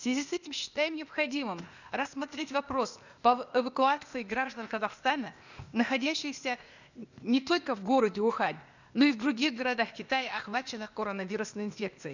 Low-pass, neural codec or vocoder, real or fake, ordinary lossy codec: 7.2 kHz; codec, 16 kHz, 0.8 kbps, ZipCodec; fake; none